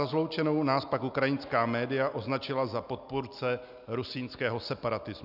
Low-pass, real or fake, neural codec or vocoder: 5.4 kHz; real; none